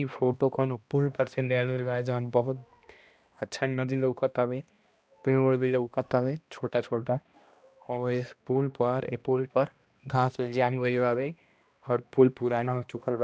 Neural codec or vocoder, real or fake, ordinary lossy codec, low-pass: codec, 16 kHz, 1 kbps, X-Codec, HuBERT features, trained on balanced general audio; fake; none; none